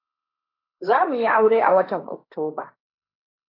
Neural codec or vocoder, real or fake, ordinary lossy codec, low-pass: codec, 16 kHz, 1.1 kbps, Voila-Tokenizer; fake; AAC, 32 kbps; 5.4 kHz